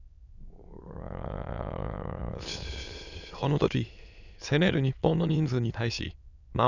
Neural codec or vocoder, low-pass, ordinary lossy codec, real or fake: autoencoder, 22.05 kHz, a latent of 192 numbers a frame, VITS, trained on many speakers; 7.2 kHz; none; fake